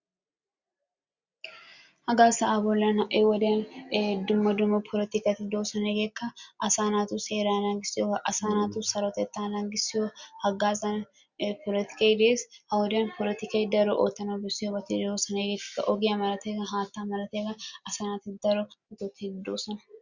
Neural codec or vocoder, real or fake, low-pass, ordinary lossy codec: none; real; 7.2 kHz; Opus, 64 kbps